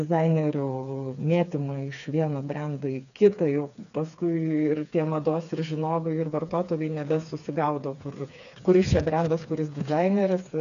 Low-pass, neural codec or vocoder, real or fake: 7.2 kHz; codec, 16 kHz, 4 kbps, FreqCodec, smaller model; fake